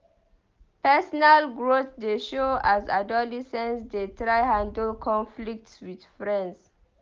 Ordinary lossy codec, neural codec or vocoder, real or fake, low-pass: Opus, 24 kbps; none; real; 7.2 kHz